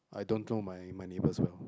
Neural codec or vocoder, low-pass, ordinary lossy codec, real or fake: none; none; none; real